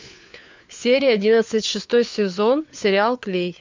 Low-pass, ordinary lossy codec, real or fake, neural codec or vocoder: 7.2 kHz; MP3, 64 kbps; fake; codec, 16 kHz, 4 kbps, FunCodec, trained on LibriTTS, 50 frames a second